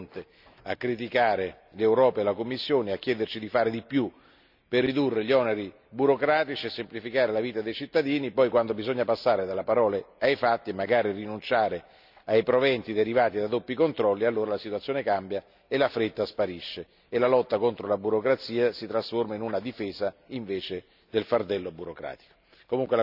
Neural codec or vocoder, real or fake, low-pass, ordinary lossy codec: none; real; 5.4 kHz; none